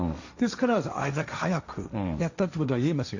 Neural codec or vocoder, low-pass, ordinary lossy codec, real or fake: codec, 16 kHz, 1.1 kbps, Voila-Tokenizer; 7.2 kHz; none; fake